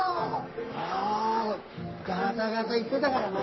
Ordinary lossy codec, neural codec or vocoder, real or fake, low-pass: MP3, 24 kbps; codec, 44.1 kHz, 3.4 kbps, Pupu-Codec; fake; 7.2 kHz